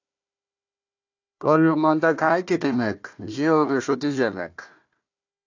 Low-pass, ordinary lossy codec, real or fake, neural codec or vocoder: 7.2 kHz; AAC, 48 kbps; fake; codec, 16 kHz, 1 kbps, FunCodec, trained on Chinese and English, 50 frames a second